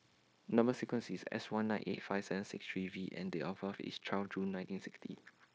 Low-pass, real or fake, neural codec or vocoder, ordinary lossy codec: none; fake; codec, 16 kHz, 0.9 kbps, LongCat-Audio-Codec; none